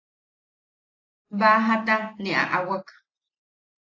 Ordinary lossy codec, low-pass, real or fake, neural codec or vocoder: AAC, 32 kbps; 7.2 kHz; real; none